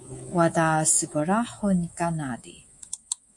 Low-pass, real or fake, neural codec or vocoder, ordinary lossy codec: 10.8 kHz; fake; codec, 24 kHz, 3.1 kbps, DualCodec; MP3, 48 kbps